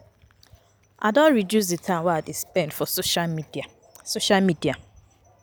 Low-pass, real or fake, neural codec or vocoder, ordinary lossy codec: none; real; none; none